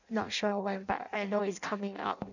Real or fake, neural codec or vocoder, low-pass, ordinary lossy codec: fake; codec, 16 kHz in and 24 kHz out, 0.6 kbps, FireRedTTS-2 codec; 7.2 kHz; none